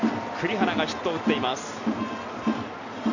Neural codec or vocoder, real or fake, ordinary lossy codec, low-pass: none; real; none; 7.2 kHz